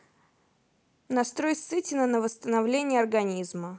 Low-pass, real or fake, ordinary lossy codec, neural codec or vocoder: none; real; none; none